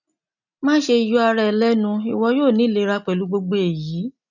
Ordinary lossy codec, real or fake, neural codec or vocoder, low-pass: none; real; none; 7.2 kHz